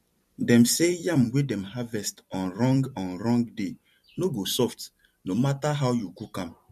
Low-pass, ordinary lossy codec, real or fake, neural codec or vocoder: 14.4 kHz; MP3, 64 kbps; fake; vocoder, 44.1 kHz, 128 mel bands every 256 samples, BigVGAN v2